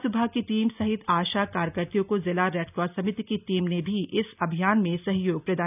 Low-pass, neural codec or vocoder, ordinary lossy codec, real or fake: 3.6 kHz; none; none; real